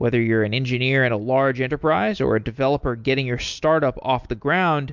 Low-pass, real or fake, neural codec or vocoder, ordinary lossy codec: 7.2 kHz; real; none; MP3, 64 kbps